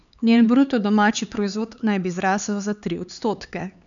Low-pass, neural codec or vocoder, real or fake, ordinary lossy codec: 7.2 kHz; codec, 16 kHz, 4 kbps, X-Codec, HuBERT features, trained on LibriSpeech; fake; none